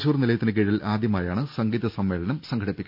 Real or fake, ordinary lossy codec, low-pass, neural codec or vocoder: real; none; 5.4 kHz; none